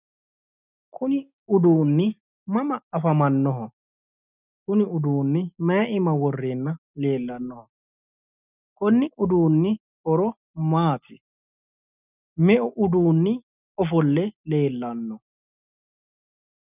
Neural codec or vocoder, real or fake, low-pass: none; real; 3.6 kHz